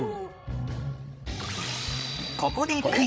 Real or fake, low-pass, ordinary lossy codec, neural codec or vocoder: fake; none; none; codec, 16 kHz, 16 kbps, FreqCodec, larger model